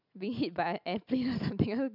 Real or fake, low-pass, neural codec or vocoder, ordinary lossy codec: real; 5.4 kHz; none; none